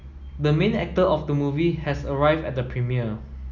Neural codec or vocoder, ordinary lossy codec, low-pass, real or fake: none; none; 7.2 kHz; real